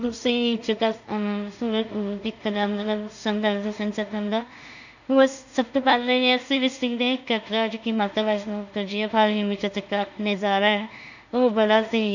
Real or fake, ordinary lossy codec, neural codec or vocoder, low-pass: fake; none; codec, 16 kHz in and 24 kHz out, 0.4 kbps, LongCat-Audio-Codec, two codebook decoder; 7.2 kHz